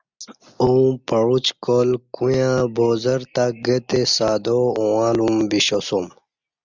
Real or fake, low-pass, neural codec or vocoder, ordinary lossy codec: real; 7.2 kHz; none; Opus, 64 kbps